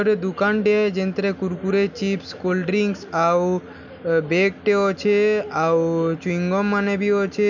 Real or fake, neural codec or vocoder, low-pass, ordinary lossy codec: real; none; 7.2 kHz; none